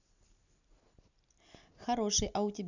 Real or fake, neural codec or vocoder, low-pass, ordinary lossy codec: real; none; 7.2 kHz; none